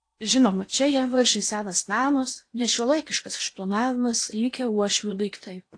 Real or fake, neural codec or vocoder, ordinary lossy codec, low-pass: fake; codec, 16 kHz in and 24 kHz out, 0.8 kbps, FocalCodec, streaming, 65536 codes; AAC, 48 kbps; 9.9 kHz